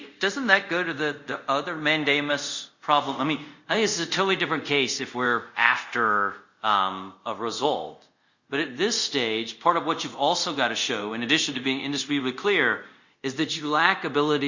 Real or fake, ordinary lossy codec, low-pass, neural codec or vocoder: fake; Opus, 64 kbps; 7.2 kHz; codec, 24 kHz, 0.5 kbps, DualCodec